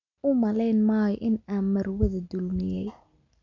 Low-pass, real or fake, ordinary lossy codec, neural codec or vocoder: 7.2 kHz; real; none; none